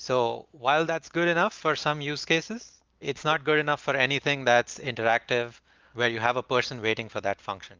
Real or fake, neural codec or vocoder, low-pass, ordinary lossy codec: real; none; 7.2 kHz; Opus, 24 kbps